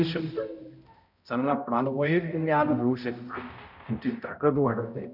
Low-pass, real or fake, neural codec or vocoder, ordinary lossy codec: 5.4 kHz; fake; codec, 16 kHz, 0.5 kbps, X-Codec, HuBERT features, trained on balanced general audio; none